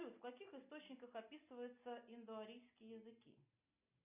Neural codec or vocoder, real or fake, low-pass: none; real; 3.6 kHz